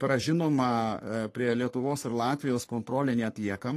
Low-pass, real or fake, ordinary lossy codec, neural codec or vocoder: 14.4 kHz; fake; AAC, 48 kbps; codec, 44.1 kHz, 3.4 kbps, Pupu-Codec